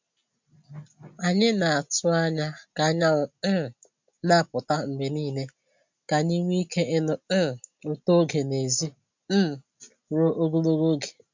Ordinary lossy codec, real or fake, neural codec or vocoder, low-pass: MP3, 64 kbps; real; none; 7.2 kHz